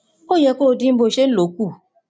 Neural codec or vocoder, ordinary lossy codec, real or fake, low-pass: none; none; real; none